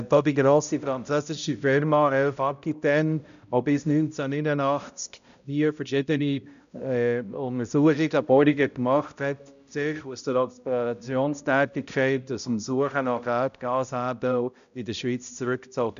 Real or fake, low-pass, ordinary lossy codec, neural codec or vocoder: fake; 7.2 kHz; none; codec, 16 kHz, 0.5 kbps, X-Codec, HuBERT features, trained on balanced general audio